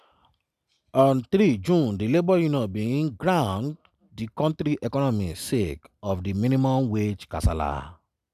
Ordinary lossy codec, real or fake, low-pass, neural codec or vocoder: none; real; 14.4 kHz; none